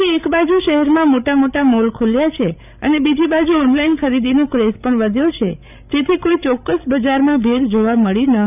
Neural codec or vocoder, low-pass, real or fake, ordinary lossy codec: codec, 16 kHz, 16 kbps, FreqCodec, larger model; 3.6 kHz; fake; none